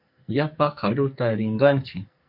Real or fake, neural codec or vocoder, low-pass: fake; codec, 44.1 kHz, 2.6 kbps, SNAC; 5.4 kHz